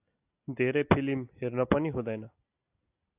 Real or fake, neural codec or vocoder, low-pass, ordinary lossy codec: real; none; 3.6 kHz; AAC, 32 kbps